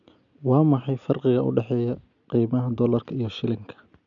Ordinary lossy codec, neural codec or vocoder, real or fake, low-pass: none; none; real; 7.2 kHz